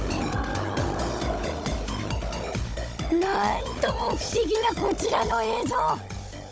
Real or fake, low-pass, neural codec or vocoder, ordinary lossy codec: fake; none; codec, 16 kHz, 16 kbps, FunCodec, trained on Chinese and English, 50 frames a second; none